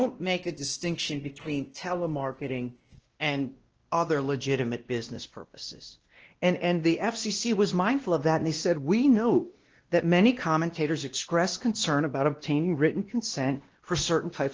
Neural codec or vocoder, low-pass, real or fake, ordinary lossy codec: codec, 16 kHz, 1 kbps, X-Codec, WavLM features, trained on Multilingual LibriSpeech; 7.2 kHz; fake; Opus, 16 kbps